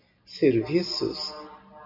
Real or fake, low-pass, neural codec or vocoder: real; 5.4 kHz; none